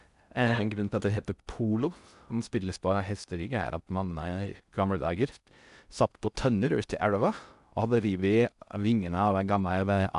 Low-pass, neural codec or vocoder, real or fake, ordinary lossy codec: 10.8 kHz; codec, 16 kHz in and 24 kHz out, 0.6 kbps, FocalCodec, streaming, 2048 codes; fake; none